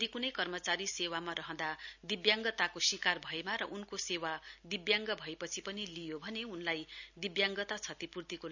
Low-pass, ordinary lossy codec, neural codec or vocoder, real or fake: 7.2 kHz; none; none; real